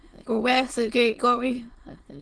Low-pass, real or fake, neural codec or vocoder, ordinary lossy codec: 9.9 kHz; fake; autoencoder, 22.05 kHz, a latent of 192 numbers a frame, VITS, trained on many speakers; Opus, 16 kbps